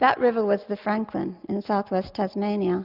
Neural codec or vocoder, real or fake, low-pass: none; real; 5.4 kHz